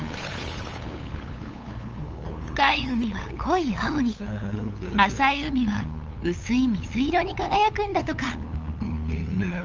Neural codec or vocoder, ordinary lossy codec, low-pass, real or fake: codec, 16 kHz, 8 kbps, FunCodec, trained on LibriTTS, 25 frames a second; Opus, 24 kbps; 7.2 kHz; fake